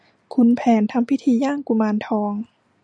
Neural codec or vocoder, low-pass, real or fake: none; 9.9 kHz; real